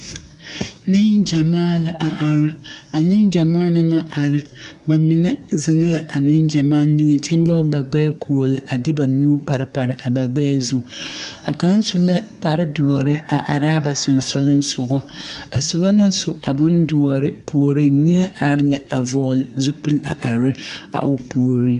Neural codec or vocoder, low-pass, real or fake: codec, 24 kHz, 1 kbps, SNAC; 10.8 kHz; fake